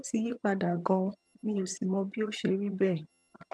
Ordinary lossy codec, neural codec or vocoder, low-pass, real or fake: none; vocoder, 22.05 kHz, 80 mel bands, HiFi-GAN; none; fake